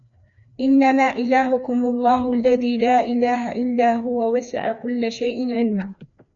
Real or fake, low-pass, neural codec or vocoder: fake; 7.2 kHz; codec, 16 kHz, 2 kbps, FreqCodec, larger model